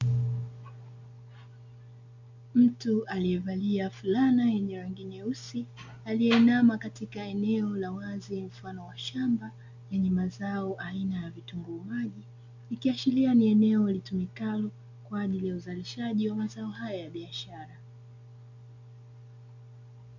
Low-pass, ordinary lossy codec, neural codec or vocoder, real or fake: 7.2 kHz; MP3, 64 kbps; none; real